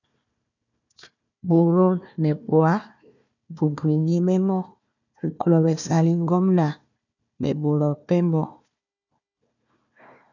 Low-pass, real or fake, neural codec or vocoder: 7.2 kHz; fake; codec, 16 kHz, 1 kbps, FunCodec, trained on Chinese and English, 50 frames a second